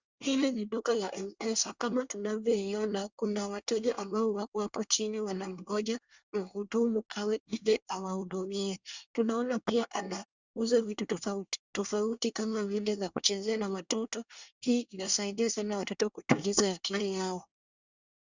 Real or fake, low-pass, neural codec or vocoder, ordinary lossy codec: fake; 7.2 kHz; codec, 24 kHz, 1 kbps, SNAC; Opus, 64 kbps